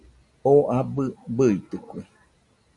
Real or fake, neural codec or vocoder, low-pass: real; none; 10.8 kHz